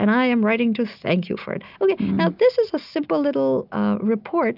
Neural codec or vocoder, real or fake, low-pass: none; real; 5.4 kHz